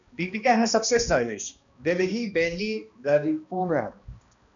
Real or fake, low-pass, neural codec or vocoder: fake; 7.2 kHz; codec, 16 kHz, 1 kbps, X-Codec, HuBERT features, trained on balanced general audio